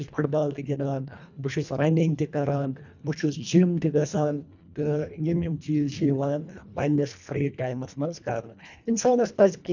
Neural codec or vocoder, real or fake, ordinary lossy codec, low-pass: codec, 24 kHz, 1.5 kbps, HILCodec; fake; none; 7.2 kHz